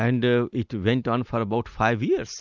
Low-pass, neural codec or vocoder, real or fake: 7.2 kHz; none; real